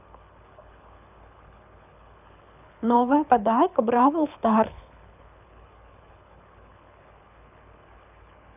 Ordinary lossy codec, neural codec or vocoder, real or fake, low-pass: none; codec, 24 kHz, 6 kbps, HILCodec; fake; 3.6 kHz